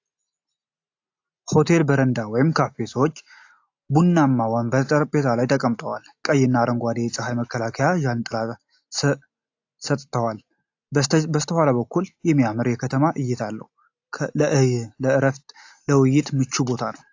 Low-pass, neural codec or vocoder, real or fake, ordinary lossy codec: 7.2 kHz; none; real; AAC, 48 kbps